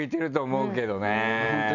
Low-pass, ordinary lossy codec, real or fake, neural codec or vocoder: 7.2 kHz; none; real; none